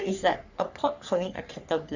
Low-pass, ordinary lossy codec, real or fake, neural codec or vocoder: 7.2 kHz; Opus, 64 kbps; fake; codec, 44.1 kHz, 3.4 kbps, Pupu-Codec